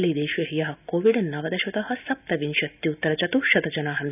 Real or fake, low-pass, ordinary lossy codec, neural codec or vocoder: real; 3.6 kHz; none; none